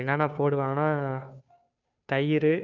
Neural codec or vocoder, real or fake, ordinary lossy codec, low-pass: codec, 16 kHz, 2 kbps, FunCodec, trained on Chinese and English, 25 frames a second; fake; none; 7.2 kHz